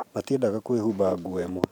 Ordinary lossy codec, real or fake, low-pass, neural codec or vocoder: none; fake; 19.8 kHz; vocoder, 44.1 kHz, 128 mel bands every 512 samples, BigVGAN v2